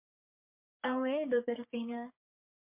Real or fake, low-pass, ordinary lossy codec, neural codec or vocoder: fake; 3.6 kHz; MP3, 32 kbps; codec, 44.1 kHz, 3.4 kbps, Pupu-Codec